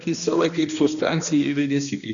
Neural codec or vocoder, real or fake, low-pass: codec, 16 kHz, 1 kbps, X-Codec, HuBERT features, trained on balanced general audio; fake; 7.2 kHz